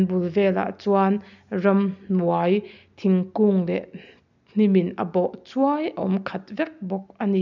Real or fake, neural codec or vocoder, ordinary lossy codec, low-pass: real; none; none; 7.2 kHz